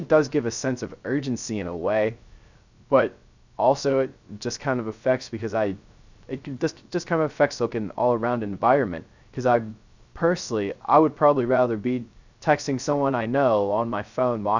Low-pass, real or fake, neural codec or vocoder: 7.2 kHz; fake; codec, 16 kHz, 0.3 kbps, FocalCodec